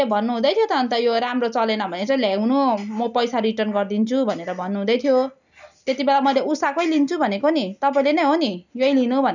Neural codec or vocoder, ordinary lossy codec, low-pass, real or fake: none; none; 7.2 kHz; real